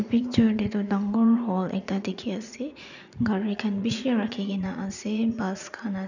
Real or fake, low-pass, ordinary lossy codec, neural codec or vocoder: fake; 7.2 kHz; none; vocoder, 44.1 kHz, 80 mel bands, Vocos